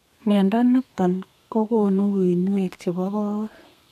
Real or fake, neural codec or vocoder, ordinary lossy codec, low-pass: fake; codec, 32 kHz, 1.9 kbps, SNAC; none; 14.4 kHz